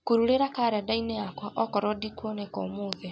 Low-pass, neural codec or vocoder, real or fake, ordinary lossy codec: none; none; real; none